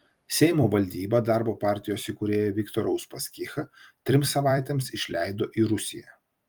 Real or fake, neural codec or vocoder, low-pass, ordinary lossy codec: fake; vocoder, 44.1 kHz, 128 mel bands every 256 samples, BigVGAN v2; 19.8 kHz; Opus, 32 kbps